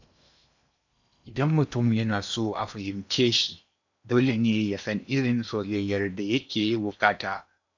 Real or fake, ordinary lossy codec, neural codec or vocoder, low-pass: fake; none; codec, 16 kHz in and 24 kHz out, 0.8 kbps, FocalCodec, streaming, 65536 codes; 7.2 kHz